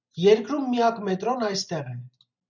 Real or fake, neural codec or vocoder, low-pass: real; none; 7.2 kHz